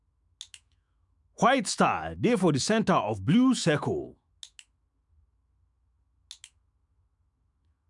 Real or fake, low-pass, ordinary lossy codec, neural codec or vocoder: fake; 10.8 kHz; none; autoencoder, 48 kHz, 128 numbers a frame, DAC-VAE, trained on Japanese speech